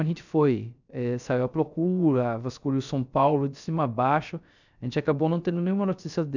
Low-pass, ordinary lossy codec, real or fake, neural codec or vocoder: 7.2 kHz; none; fake; codec, 16 kHz, 0.3 kbps, FocalCodec